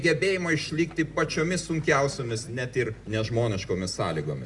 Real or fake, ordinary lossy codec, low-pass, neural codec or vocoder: real; Opus, 64 kbps; 10.8 kHz; none